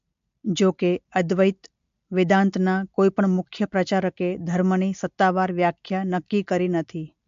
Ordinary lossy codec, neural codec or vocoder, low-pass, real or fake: MP3, 48 kbps; none; 7.2 kHz; real